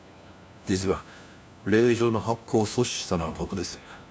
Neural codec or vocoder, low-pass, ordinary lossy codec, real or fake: codec, 16 kHz, 1 kbps, FunCodec, trained on LibriTTS, 50 frames a second; none; none; fake